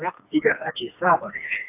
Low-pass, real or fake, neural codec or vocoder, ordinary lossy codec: 3.6 kHz; fake; codec, 16 kHz, 2 kbps, FreqCodec, smaller model; AAC, 24 kbps